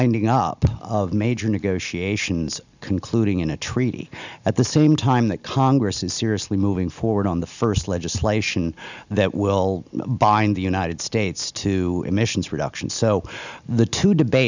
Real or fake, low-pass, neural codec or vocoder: real; 7.2 kHz; none